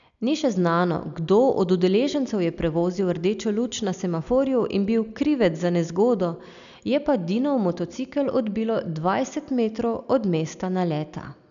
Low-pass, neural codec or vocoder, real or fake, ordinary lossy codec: 7.2 kHz; none; real; none